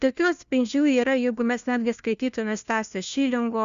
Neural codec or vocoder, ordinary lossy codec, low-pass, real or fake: codec, 16 kHz, 1 kbps, FunCodec, trained on LibriTTS, 50 frames a second; Opus, 64 kbps; 7.2 kHz; fake